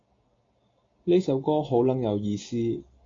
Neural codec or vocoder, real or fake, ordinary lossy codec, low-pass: none; real; AAC, 32 kbps; 7.2 kHz